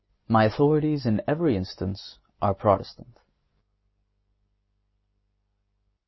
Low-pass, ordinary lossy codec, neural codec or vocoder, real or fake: 7.2 kHz; MP3, 24 kbps; none; real